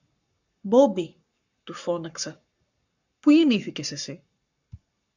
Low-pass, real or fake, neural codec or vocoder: 7.2 kHz; fake; codec, 44.1 kHz, 7.8 kbps, Pupu-Codec